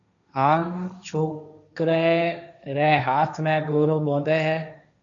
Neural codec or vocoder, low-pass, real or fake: codec, 16 kHz, 1.1 kbps, Voila-Tokenizer; 7.2 kHz; fake